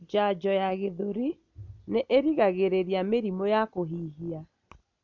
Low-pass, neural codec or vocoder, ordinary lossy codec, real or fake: 7.2 kHz; none; MP3, 64 kbps; real